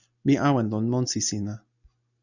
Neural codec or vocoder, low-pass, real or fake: none; 7.2 kHz; real